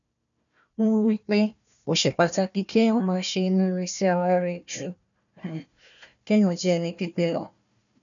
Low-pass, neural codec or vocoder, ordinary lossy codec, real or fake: 7.2 kHz; codec, 16 kHz, 1 kbps, FunCodec, trained on Chinese and English, 50 frames a second; none; fake